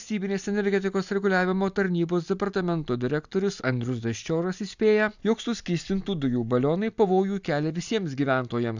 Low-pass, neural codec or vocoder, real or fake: 7.2 kHz; none; real